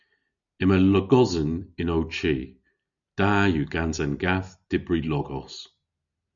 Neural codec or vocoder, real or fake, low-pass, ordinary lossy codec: none; real; 7.2 kHz; MP3, 96 kbps